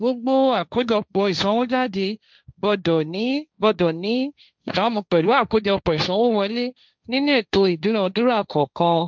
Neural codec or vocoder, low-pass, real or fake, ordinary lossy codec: codec, 16 kHz, 1.1 kbps, Voila-Tokenizer; none; fake; none